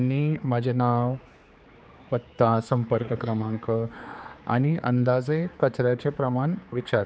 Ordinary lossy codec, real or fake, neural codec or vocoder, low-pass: none; fake; codec, 16 kHz, 4 kbps, X-Codec, HuBERT features, trained on general audio; none